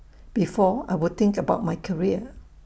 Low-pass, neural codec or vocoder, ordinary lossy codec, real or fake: none; none; none; real